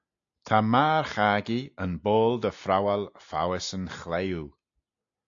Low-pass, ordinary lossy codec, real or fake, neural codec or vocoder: 7.2 kHz; AAC, 64 kbps; real; none